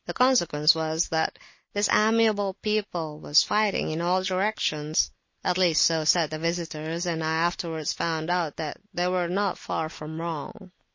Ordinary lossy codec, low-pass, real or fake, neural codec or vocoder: MP3, 32 kbps; 7.2 kHz; real; none